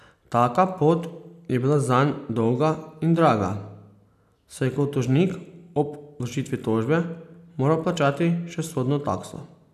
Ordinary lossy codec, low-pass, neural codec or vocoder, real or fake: none; 14.4 kHz; none; real